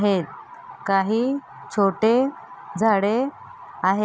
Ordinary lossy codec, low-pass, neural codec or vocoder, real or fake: none; none; none; real